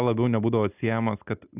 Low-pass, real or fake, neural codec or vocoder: 3.6 kHz; real; none